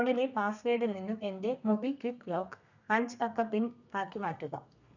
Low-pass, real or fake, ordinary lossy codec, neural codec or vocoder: 7.2 kHz; fake; none; codec, 32 kHz, 1.9 kbps, SNAC